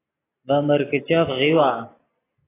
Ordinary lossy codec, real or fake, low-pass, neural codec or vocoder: AAC, 16 kbps; real; 3.6 kHz; none